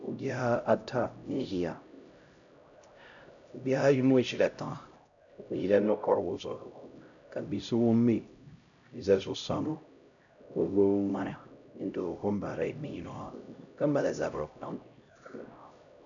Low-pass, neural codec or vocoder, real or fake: 7.2 kHz; codec, 16 kHz, 0.5 kbps, X-Codec, HuBERT features, trained on LibriSpeech; fake